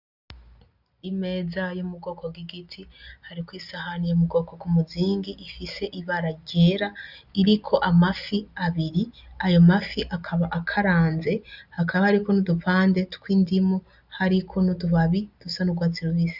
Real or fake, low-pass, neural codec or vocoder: real; 5.4 kHz; none